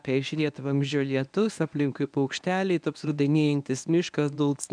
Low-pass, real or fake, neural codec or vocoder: 9.9 kHz; fake; codec, 24 kHz, 0.9 kbps, WavTokenizer, medium speech release version 1